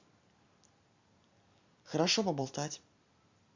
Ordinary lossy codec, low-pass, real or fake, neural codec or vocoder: Opus, 64 kbps; 7.2 kHz; fake; vocoder, 44.1 kHz, 128 mel bands every 256 samples, BigVGAN v2